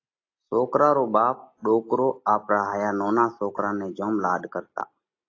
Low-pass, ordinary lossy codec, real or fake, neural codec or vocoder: 7.2 kHz; AAC, 32 kbps; real; none